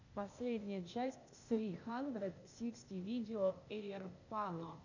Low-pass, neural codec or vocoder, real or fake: 7.2 kHz; codec, 16 kHz, 0.8 kbps, ZipCodec; fake